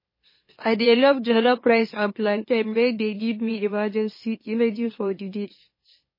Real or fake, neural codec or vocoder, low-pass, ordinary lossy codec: fake; autoencoder, 44.1 kHz, a latent of 192 numbers a frame, MeloTTS; 5.4 kHz; MP3, 24 kbps